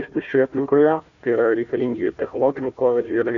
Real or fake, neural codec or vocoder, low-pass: fake; codec, 16 kHz, 1 kbps, FunCodec, trained on Chinese and English, 50 frames a second; 7.2 kHz